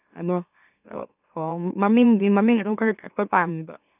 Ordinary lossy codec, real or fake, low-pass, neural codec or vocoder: none; fake; 3.6 kHz; autoencoder, 44.1 kHz, a latent of 192 numbers a frame, MeloTTS